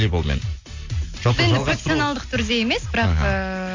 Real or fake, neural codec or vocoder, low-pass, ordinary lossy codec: real; none; 7.2 kHz; MP3, 48 kbps